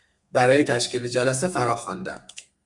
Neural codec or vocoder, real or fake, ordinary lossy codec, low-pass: codec, 32 kHz, 1.9 kbps, SNAC; fake; Opus, 64 kbps; 10.8 kHz